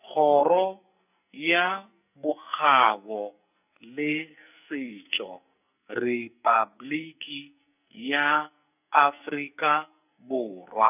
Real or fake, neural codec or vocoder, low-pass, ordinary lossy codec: fake; codec, 44.1 kHz, 2.6 kbps, SNAC; 3.6 kHz; none